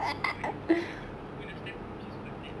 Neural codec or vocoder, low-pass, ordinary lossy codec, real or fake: none; none; none; real